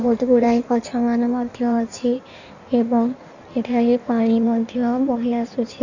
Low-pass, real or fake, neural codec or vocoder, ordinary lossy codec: 7.2 kHz; fake; codec, 16 kHz in and 24 kHz out, 1.1 kbps, FireRedTTS-2 codec; none